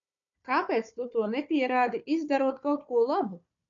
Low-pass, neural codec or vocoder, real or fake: 7.2 kHz; codec, 16 kHz, 4 kbps, FunCodec, trained on Chinese and English, 50 frames a second; fake